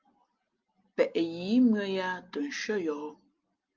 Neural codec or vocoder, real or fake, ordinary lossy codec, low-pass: none; real; Opus, 24 kbps; 7.2 kHz